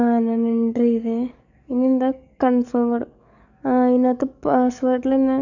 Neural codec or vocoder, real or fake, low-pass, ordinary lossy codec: codec, 44.1 kHz, 7.8 kbps, DAC; fake; 7.2 kHz; none